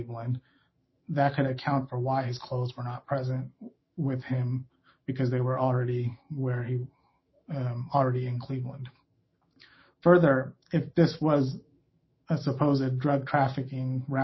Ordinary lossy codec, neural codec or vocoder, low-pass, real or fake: MP3, 24 kbps; autoencoder, 48 kHz, 128 numbers a frame, DAC-VAE, trained on Japanese speech; 7.2 kHz; fake